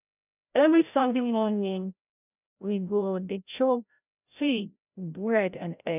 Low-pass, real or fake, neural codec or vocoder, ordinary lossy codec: 3.6 kHz; fake; codec, 16 kHz, 0.5 kbps, FreqCodec, larger model; none